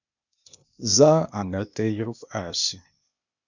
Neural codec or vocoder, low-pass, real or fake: codec, 16 kHz, 0.8 kbps, ZipCodec; 7.2 kHz; fake